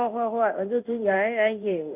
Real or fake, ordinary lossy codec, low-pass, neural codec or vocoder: fake; none; 3.6 kHz; codec, 16 kHz in and 24 kHz out, 1 kbps, XY-Tokenizer